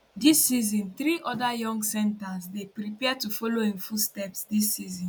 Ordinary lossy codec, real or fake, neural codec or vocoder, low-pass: none; real; none; none